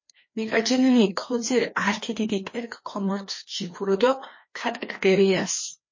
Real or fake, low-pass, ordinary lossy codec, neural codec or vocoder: fake; 7.2 kHz; MP3, 32 kbps; codec, 16 kHz, 2 kbps, FreqCodec, larger model